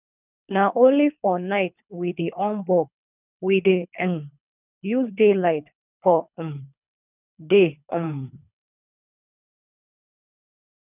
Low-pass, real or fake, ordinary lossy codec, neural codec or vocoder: 3.6 kHz; fake; none; codec, 24 kHz, 3 kbps, HILCodec